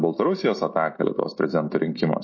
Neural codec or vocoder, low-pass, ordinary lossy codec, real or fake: none; 7.2 kHz; MP3, 32 kbps; real